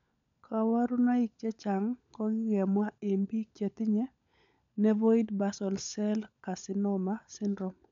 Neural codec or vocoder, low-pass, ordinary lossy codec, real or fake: codec, 16 kHz, 16 kbps, FunCodec, trained on LibriTTS, 50 frames a second; 7.2 kHz; MP3, 64 kbps; fake